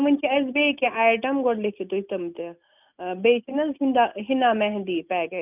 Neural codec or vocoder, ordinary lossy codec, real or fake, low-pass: none; none; real; 3.6 kHz